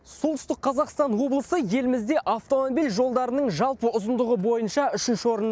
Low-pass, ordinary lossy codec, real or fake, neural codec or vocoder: none; none; real; none